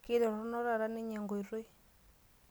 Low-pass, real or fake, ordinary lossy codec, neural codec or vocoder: none; real; none; none